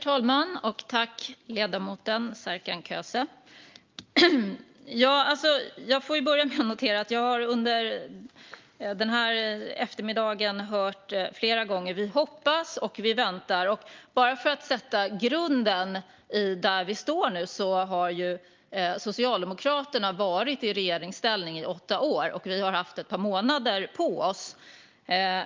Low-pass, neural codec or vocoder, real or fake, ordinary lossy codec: 7.2 kHz; none; real; Opus, 24 kbps